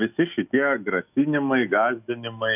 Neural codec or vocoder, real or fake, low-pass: autoencoder, 48 kHz, 128 numbers a frame, DAC-VAE, trained on Japanese speech; fake; 3.6 kHz